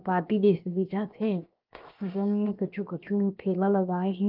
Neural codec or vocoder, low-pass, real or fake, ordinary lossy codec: codec, 16 kHz, 0.7 kbps, FocalCodec; 5.4 kHz; fake; Opus, 24 kbps